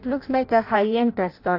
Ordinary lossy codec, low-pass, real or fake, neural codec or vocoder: AAC, 32 kbps; 5.4 kHz; fake; codec, 16 kHz in and 24 kHz out, 0.6 kbps, FireRedTTS-2 codec